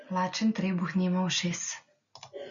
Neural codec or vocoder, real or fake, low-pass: none; real; 7.2 kHz